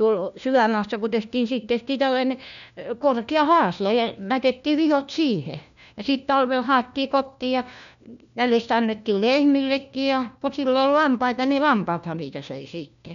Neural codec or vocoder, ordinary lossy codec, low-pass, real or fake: codec, 16 kHz, 1 kbps, FunCodec, trained on LibriTTS, 50 frames a second; none; 7.2 kHz; fake